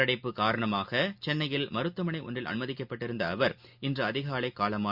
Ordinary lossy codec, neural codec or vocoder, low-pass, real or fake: Opus, 64 kbps; none; 5.4 kHz; real